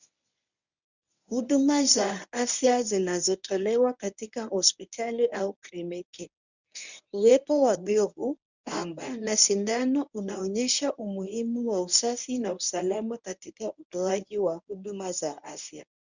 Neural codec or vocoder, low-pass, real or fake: codec, 24 kHz, 0.9 kbps, WavTokenizer, medium speech release version 1; 7.2 kHz; fake